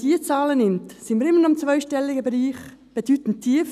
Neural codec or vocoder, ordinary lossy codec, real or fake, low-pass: none; none; real; 14.4 kHz